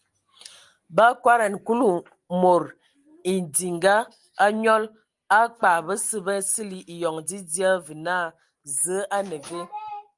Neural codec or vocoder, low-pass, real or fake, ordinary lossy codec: none; 10.8 kHz; real; Opus, 32 kbps